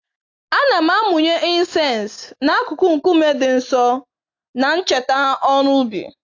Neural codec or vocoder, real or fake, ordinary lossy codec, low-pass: none; real; AAC, 48 kbps; 7.2 kHz